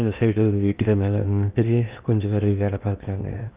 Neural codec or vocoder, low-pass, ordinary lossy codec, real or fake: codec, 16 kHz in and 24 kHz out, 0.8 kbps, FocalCodec, streaming, 65536 codes; 3.6 kHz; Opus, 24 kbps; fake